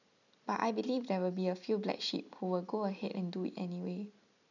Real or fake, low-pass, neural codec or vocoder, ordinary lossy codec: real; 7.2 kHz; none; none